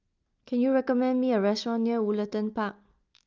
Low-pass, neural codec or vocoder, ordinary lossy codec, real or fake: 7.2 kHz; none; Opus, 24 kbps; real